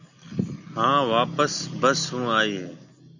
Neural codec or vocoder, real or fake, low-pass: none; real; 7.2 kHz